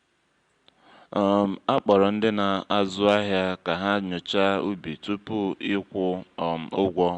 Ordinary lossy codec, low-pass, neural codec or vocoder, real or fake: Opus, 64 kbps; 9.9 kHz; none; real